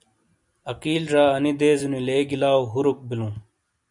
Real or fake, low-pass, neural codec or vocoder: real; 10.8 kHz; none